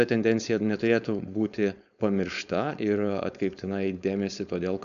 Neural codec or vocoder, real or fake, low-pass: codec, 16 kHz, 4.8 kbps, FACodec; fake; 7.2 kHz